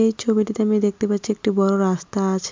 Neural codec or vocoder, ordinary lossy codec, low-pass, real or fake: none; MP3, 64 kbps; 7.2 kHz; real